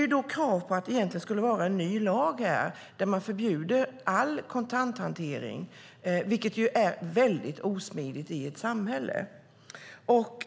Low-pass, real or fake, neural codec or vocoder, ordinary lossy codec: none; real; none; none